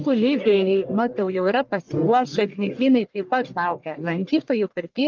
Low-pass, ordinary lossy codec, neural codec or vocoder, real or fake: 7.2 kHz; Opus, 24 kbps; codec, 44.1 kHz, 1.7 kbps, Pupu-Codec; fake